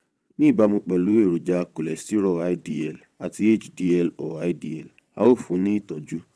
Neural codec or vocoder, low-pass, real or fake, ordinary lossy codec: vocoder, 22.05 kHz, 80 mel bands, WaveNeXt; none; fake; none